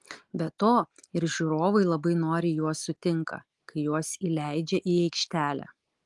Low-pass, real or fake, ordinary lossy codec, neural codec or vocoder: 10.8 kHz; real; Opus, 24 kbps; none